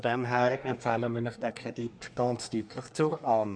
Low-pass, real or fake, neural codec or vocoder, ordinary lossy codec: 9.9 kHz; fake; codec, 24 kHz, 1 kbps, SNAC; none